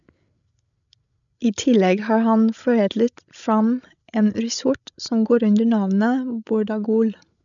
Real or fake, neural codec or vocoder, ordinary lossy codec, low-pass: fake; codec, 16 kHz, 16 kbps, FreqCodec, larger model; none; 7.2 kHz